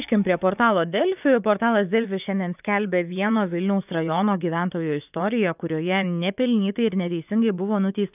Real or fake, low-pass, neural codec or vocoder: fake; 3.6 kHz; vocoder, 24 kHz, 100 mel bands, Vocos